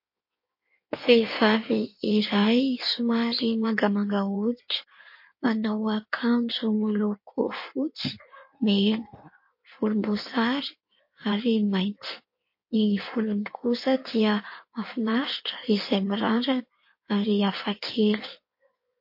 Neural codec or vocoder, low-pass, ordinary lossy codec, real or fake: codec, 16 kHz in and 24 kHz out, 1.1 kbps, FireRedTTS-2 codec; 5.4 kHz; MP3, 32 kbps; fake